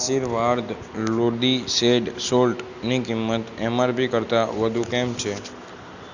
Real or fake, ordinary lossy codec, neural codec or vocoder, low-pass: real; Opus, 64 kbps; none; 7.2 kHz